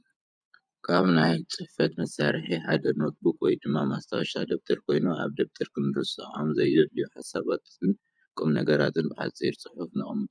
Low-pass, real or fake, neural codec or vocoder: 9.9 kHz; fake; vocoder, 24 kHz, 100 mel bands, Vocos